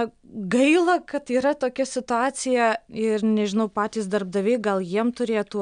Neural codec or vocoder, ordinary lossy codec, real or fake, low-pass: none; MP3, 96 kbps; real; 9.9 kHz